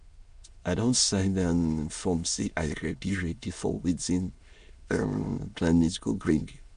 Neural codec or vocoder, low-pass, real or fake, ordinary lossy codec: autoencoder, 22.05 kHz, a latent of 192 numbers a frame, VITS, trained on many speakers; 9.9 kHz; fake; MP3, 64 kbps